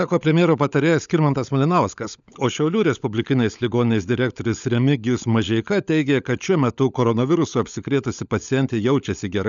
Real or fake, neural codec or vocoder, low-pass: fake; codec, 16 kHz, 16 kbps, FunCodec, trained on LibriTTS, 50 frames a second; 7.2 kHz